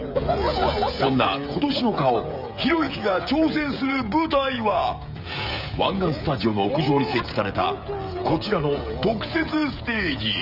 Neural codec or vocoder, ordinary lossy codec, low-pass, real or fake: codec, 16 kHz, 16 kbps, FreqCodec, smaller model; none; 5.4 kHz; fake